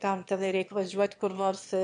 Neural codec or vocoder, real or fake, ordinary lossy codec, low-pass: autoencoder, 22.05 kHz, a latent of 192 numbers a frame, VITS, trained on one speaker; fake; MP3, 64 kbps; 9.9 kHz